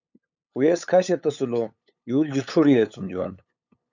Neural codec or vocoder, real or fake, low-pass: codec, 16 kHz, 8 kbps, FunCodec, trained on LibriTTS, 25 frames a second; fake; 7.2 kHz